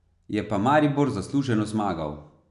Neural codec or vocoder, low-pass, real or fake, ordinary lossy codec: none; 10.8 kHz; real; none